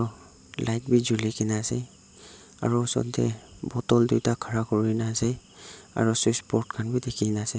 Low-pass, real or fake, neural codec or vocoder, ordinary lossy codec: none; real; none; none